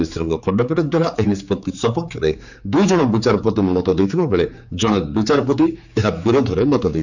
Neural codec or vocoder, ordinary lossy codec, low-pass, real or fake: codec, 16 kHz, 4 kbps, X-Codec, HuBERT features, trained on general audio; none; 7.2 kHz; fake